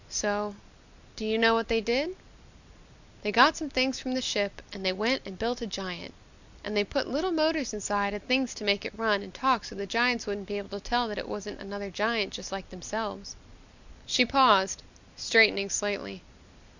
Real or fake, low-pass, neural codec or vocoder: real; 7.2 kHz; none